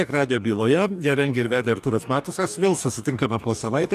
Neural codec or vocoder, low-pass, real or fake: codec, 44.1 kHz, 2.6 kbps, DAC; 14.4 kHz; fake